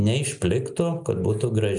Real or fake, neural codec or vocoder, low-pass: real; none; 10.8 kHz